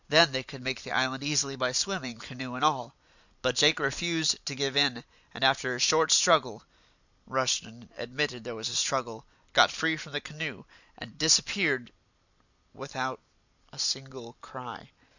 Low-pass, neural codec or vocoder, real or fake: 7.2 kHz; vocoder, 44.1 kHz, 128 mel bands every 256 samples, BigVGAN v2; fake